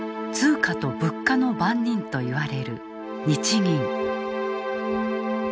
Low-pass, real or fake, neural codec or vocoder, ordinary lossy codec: none; real; none; none